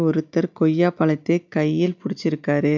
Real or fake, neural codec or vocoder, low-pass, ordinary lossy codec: real; none; 7.2 kHz; none